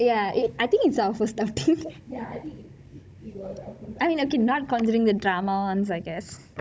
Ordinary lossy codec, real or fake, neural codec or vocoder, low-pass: none; fake; codec, 16 kHz, 16 kbps, FunCodec, trained on Chinese and English, 50 frames a second; none